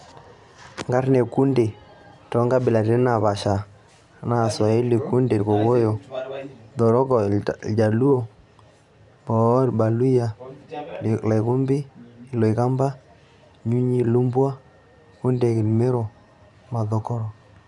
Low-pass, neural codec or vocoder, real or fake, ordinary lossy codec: 10.8 kHz; vocoder, 44.1 kHz, 128 mel bands every 512 samples, BigVGAN v2; fake; none